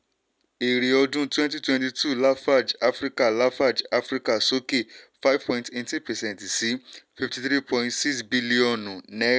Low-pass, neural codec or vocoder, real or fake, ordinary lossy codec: none; none; real; none